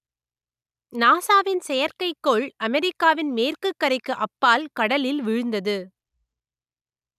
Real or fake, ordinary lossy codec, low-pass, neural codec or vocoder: real; none; 14.4 kHz; none